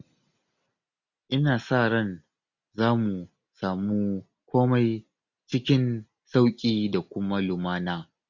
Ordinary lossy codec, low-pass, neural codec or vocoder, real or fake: none; 7.2 kHz; none; real